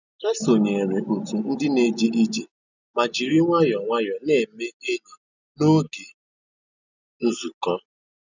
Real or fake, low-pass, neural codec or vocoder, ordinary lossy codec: real; 7.2 kHz; none; none